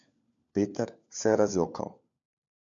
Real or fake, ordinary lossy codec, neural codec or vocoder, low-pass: fake; MP3, 64 kbps; codec, 16 kHz, 4 kbps, FunCodec, trained on LibriTTS, 50 frames a second; 7.2 kHz